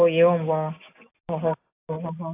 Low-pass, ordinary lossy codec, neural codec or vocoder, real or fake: 3.6 kHz; AAC, 32 kbps; none; real